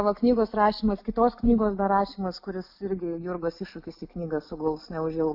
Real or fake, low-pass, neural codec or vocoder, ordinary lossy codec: real; 5.4 kHz; none; AAC, 48 kbps